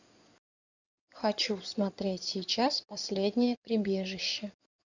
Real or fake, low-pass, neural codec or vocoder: fake; 7.2 kHz; codec, 16 kHz in and 24 kHz out, 2.2 kbps, FireRedTTS-2 codec